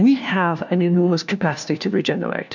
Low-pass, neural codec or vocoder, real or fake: 7.2 kHz; codec, 16 kHz, 1 kbps, FunCodec, trained on LibriTTS, 50 frames a second; fake